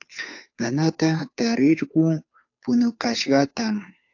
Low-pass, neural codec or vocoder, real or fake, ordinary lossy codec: 7.2 kHz; codec, 16 kHz, 2 kbps, FunCodec, trained on Chinese and English, 25 frames a second; fake; AAC, 48 kbps